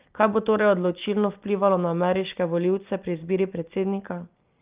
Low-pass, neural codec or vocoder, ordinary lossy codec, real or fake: 3.6 kHz; none; Opus, 24 kbps; real